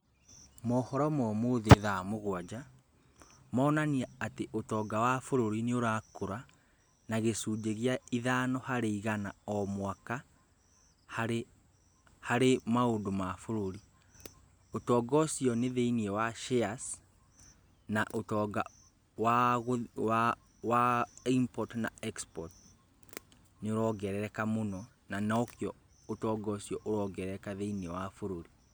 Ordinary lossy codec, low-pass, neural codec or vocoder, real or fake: none; none; none; real